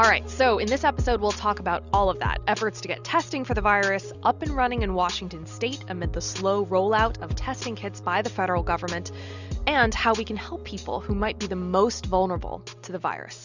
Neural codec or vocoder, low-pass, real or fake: none; 7.2 kHz; real